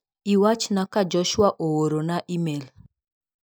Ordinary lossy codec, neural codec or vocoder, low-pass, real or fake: none; none; none; real